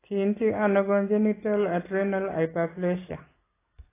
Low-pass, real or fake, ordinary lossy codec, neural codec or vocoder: 3.6 kHz; real; AAC, 16 kbps; none